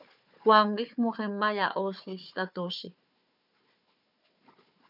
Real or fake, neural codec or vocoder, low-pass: fake; codec, 16 kHz, 4 kbps, FunCodec, trained on Chinese and English, 50 frames a second; 5.4 kHz